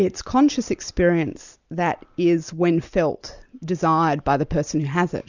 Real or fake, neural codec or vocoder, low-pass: real; none; 7.2 kHz